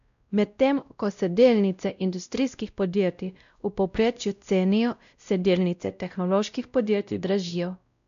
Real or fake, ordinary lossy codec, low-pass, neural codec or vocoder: fake; none; 7.2 kHz; codec, 16 kHz, 0.5 kbps, X-Codec, WavLM features, trained on Multilingual LibriSpeech